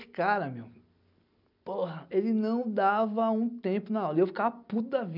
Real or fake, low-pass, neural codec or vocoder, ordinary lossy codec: real; 5.4 kHz; none; none